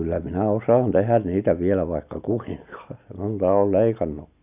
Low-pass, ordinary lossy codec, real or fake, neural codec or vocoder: 3.6 kHz; none; real; none